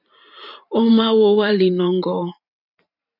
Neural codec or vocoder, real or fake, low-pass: vocoder, 44.1 kHz, 128 mel bands every 512 samples, BigVGAN v2; fake; 5.4 kHz